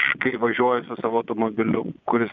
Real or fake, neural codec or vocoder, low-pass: fake; vocoder, 22.05 kHz, 80 mel bands, Vocos; 7.2 kHz